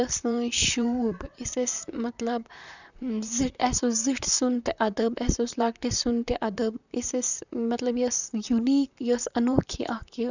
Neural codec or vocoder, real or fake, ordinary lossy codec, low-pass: vocoder, 44.1 kHz, 128 mel bands, Pupu-Vocoder; fake; none; 7.2 kHz